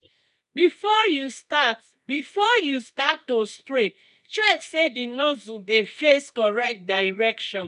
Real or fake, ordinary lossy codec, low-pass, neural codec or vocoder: fake; none; 10.8 kHz; codec, 24 kHz, 0.9 kbps, WavTokenizer, medium music audio release